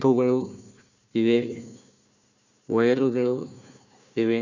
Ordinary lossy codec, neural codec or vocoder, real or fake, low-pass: none; codec, 16 kHz, 1 kbps, FunCodec, trained on Chinese and English, 50 frames a second; fake; 7.2 kHz